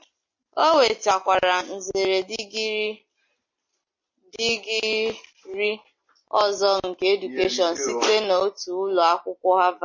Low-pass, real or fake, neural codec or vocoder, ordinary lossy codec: 7.2 kHz; real; none; MP3, 32 kbps